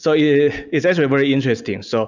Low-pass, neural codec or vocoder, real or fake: 7.2 kHz; none; real